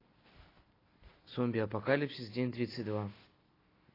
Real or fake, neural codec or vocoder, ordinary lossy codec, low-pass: real; none; AAC, 24 kbps; 5.4 kHz